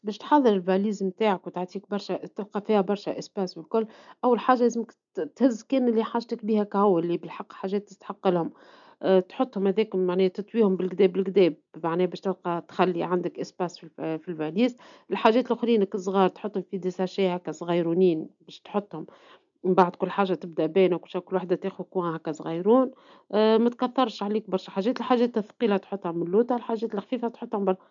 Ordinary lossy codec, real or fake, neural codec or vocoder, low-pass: none; real; none; 7.2 kHz